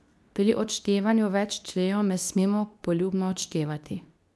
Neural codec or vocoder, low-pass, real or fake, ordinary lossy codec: codec, 24 kHz, 0.9 kbps, WavTokenizer, medium speech release version 2; none; fake; none